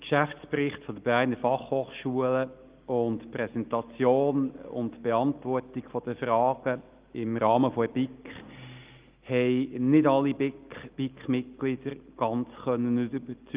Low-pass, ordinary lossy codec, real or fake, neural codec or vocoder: 3.6 kHz; Opus, 32 kbps; real; none